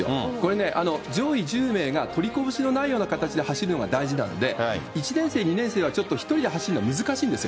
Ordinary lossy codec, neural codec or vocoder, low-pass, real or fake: none; none; none; real